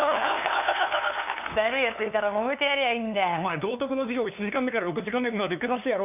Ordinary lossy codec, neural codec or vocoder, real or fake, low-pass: none; codec, 16 kHz, 2 kbps, FunCodec, trained on LibriTTS, 25 frames a second; fake; 3.6 kHz